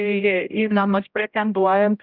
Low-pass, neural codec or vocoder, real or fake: 5.4 kHz; codec, 16 kHz, 0.5 kbps, X-Codec, HuBERT features, trained on general audio; fake